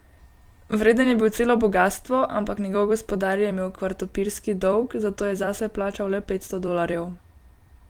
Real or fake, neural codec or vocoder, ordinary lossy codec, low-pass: fake; vocoder, 44.1 kHz, 128 mel bands every 256 samples, BigVGAN v2; Opus, 24 kbps; 19.8 kHz